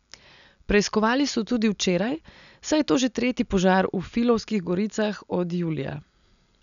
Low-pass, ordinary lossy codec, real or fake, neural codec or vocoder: 7.2 kHz; none; real; none